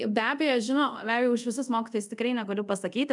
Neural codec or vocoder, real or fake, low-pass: codec, 24 kHz, 0.5 kbps, DualCodec; fake; 10.8 kHz